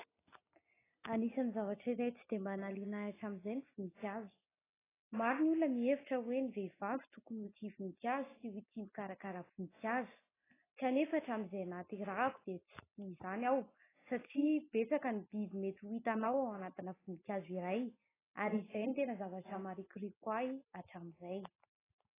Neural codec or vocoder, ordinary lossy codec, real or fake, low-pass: none; AAC, 16 kbps; real; 3.6 kHz